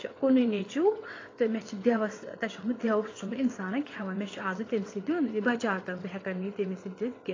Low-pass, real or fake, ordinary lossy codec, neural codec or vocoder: 7.2 kHz; fake; AAC, 32 kbps; vocoder, 22.05 kHz, 80 mel bands, WaveNeXt